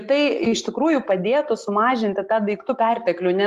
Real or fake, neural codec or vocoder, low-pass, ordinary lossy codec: real; none; 14.4 kHz; Opus, 32 kbps